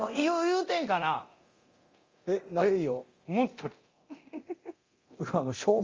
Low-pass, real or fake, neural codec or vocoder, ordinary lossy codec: 7.2 kHz; fake; codec, 24 kHz, 0.9 kbps, DualCodec; Opus, 32 kbps